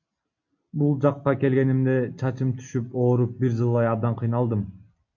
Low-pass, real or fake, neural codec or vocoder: 7.2 kHz; real; none